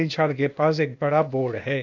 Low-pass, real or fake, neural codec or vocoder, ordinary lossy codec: 7.2 kHz; fake; codec, 16 kHz, 0.8 kbps, ZipCodec; none